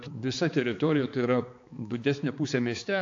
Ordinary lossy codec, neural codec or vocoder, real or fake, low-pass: AAC, 48 kbps; codec, 16 kHz, 4 kbps, X-Codec, HuBERT features, trained on balanced general audio; fake; 7.2 kHz